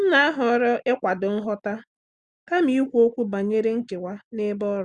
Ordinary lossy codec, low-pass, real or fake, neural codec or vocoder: Opus, 64 kbps; 9.9 kHz; real; none